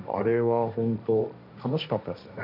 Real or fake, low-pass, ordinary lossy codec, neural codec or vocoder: fake; 5.4 kHz; none; codec, 16 kHz, 1.1 kbps, Voila-Tokenizer